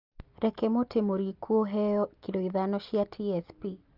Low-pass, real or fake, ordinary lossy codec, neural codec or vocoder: 5.4 kHz; real; Opus, 24 kbps; none